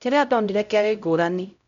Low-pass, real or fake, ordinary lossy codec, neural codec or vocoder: 7.2 kHz; fake; none; codec, 16 kHz, 0.5 kbps, X-Codec, HuBERT features, trained on LibriSpeech